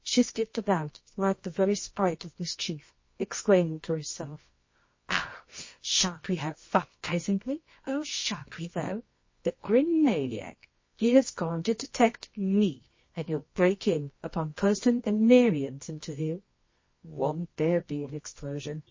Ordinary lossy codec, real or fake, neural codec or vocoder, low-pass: MP3, 32 kbps; fake; codec, 24 kHz, 0.9 kbps, WavTokenizer, medium music audio release; 7.2 kHz